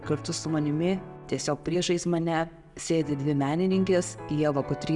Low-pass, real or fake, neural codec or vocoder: 10.8 kHz; real; none